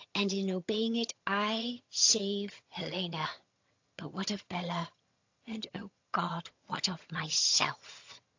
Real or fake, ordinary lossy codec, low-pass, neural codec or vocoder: fake; AAC, 48 kbps; 7.2 kHz; vocoder, 22.05 kHz, 80 mel bands, HiFi-GAN